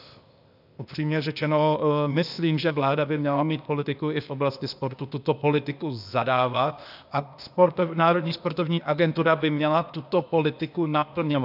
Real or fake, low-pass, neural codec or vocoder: fake; 5.4 kHz; codec, 16 kHz, 0.8 kbps, ZipCodec